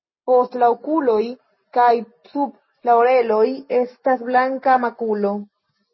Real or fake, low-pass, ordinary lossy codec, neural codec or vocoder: real; 7.2 kHz; MP3, 24 kbps; none